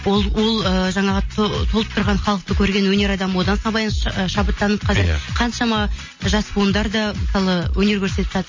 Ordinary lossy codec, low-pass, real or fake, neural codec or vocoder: MP3, 32 kbps; 7.2 kHz; real; none